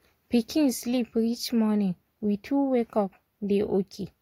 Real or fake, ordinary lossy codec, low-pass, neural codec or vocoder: real; AAC, 48 kbps; 19.8 kHz; none